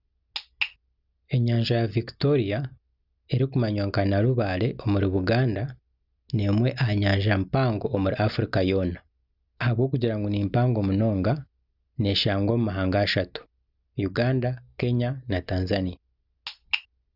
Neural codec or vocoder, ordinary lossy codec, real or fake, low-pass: none; Opus, 64 kbps; real; 5.4 kHz